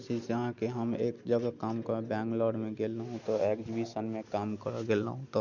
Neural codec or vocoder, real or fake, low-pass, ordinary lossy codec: none; real; 7.2 kHz; none